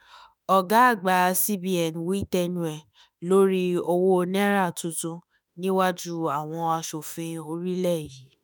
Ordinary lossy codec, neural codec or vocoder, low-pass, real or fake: none; autoencoder, 48 kHz, 32 numbers a frame, DAC-VAE, trained on Japanese speech; none; fake